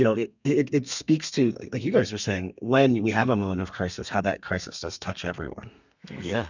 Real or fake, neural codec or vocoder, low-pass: fake; codec, 32 kHz, 1.9 kbps, SNAC; 7.2 kHz